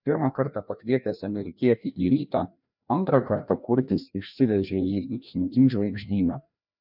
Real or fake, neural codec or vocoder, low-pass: fake; codec, 16 kHz, 1 kbps, FreqCodec, larger model; 5.4 kHz